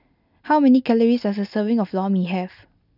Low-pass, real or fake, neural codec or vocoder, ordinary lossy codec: 5.4 kHz; real; none; none